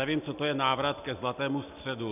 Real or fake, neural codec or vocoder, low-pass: real; none; 3.6 kHz